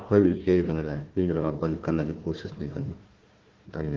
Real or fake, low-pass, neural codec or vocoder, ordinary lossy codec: fake; 7.2 kHz; codec, 16 kHz, 1 kbps, FunCodec, trained on Chinese and English, 50 frames a second; Opus, 16 kbps